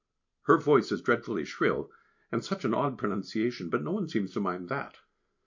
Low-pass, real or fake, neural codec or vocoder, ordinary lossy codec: 7.2 kHz; real; none; MP3, 48 kbps